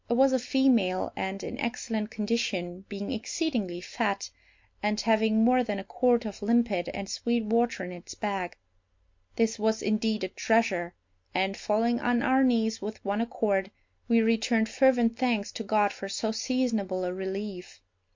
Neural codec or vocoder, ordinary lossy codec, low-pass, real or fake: none; MP3, 48 kbps; 7.2 kHz; real